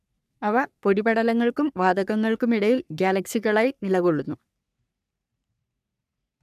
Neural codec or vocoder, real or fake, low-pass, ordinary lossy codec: codec, 44.1 kHz, 3.4 kbps, Pupu-Codec; fake; 14.4 kHz; none